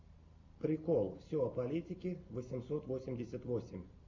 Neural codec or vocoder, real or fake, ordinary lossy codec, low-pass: none; real; AAC, 48 kbps; 7.2 kHz